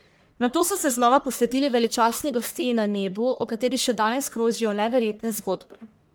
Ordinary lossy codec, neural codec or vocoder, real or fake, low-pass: none; codec, 44.1 kHz, 1.7 kbps, Pupu-Codec; fake; none